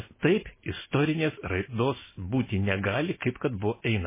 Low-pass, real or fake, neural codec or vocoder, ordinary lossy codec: 3.6 kHz; real; none; MP3, 16 kbps